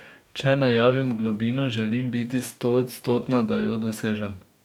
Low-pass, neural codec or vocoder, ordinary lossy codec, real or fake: 19.8 kHz; codec, 44.1 kHz, 2.6 kbps, DAC; none; fake